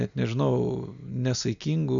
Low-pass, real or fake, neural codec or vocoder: 7.2 kHz; real; none